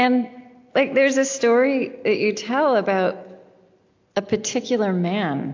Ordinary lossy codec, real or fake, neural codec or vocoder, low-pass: AAC, 48 kbps; real; none; 7.2 kHz